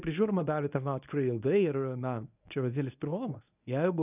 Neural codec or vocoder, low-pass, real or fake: codec, 24 kHz, 0.9 kbps, WavTokenizer, medium speech release version 1; 3.6 kHz; fake